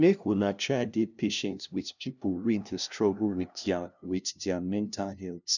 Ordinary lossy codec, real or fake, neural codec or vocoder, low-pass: none; fake; codec, 16 kHz, 0.5 kbps, FunCodec, trained on LibriTTS, 25 frames a second; 7.2 kHz